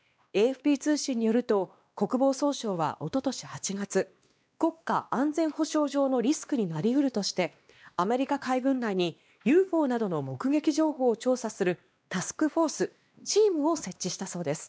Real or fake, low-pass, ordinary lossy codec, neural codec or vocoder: fake; none; none; codec, 16 kHz, 2 kbps, X-Codec, WavLM features, trained on Multilingual LibriSpeech